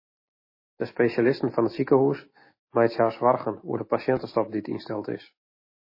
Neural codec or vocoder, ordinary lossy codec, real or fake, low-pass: none; MP3, 24 kbps; real; 5.4 kHz